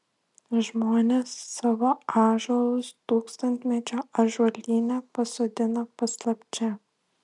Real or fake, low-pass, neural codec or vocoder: real; 10.8 kHz; none